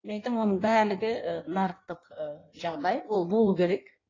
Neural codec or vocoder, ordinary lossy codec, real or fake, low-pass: codec, 16 kHz in and 24 kHz out, 1.1 kbps, FireRedTTS-2 codec; AAC, 32 kbps; fake; 7.2 kHz